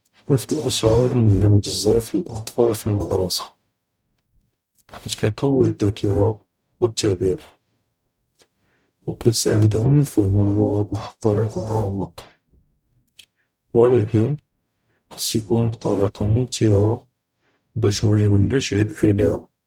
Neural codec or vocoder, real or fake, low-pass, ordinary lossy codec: codec, 44.1 kHz, 0.9 kbps, DAC; fake; 19.8 kHz; none